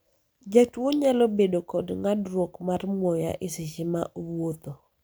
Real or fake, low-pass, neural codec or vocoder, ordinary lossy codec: real; none; none; none